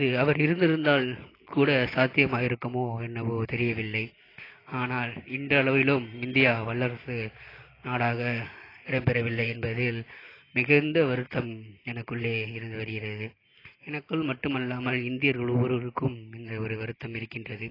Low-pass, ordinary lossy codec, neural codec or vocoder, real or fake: 5.4 kHz; AAC, 24 kbps; none; real